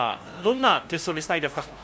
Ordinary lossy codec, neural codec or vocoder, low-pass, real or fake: none; codec, 16 kHz, 0.5 kbps, FunCodec, trained on LibriTTS, 25 frames a second; none; fake